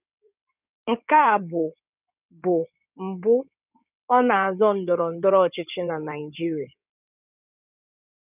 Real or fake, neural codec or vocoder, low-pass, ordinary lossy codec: fake; codec, 16 kHz in and 24 kHz out, 2.2 kbps, FireRedTTS-2 codec; 3.6 kHz; none